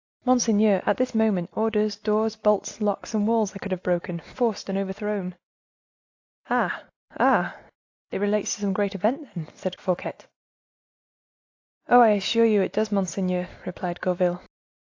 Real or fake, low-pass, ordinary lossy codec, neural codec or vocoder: real; 7.2 kHz; AAC, 48 kbps; none